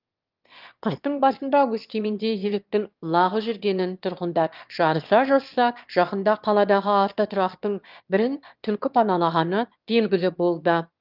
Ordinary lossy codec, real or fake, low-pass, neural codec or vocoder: Opus, 32 kbps; fake; 5.4 kHz; autoencoder, 22.05 kHz, a latent of 192 numbers a frame, VITS, trained on one speaker